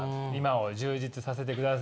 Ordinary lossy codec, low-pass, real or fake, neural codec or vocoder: none; none; real; none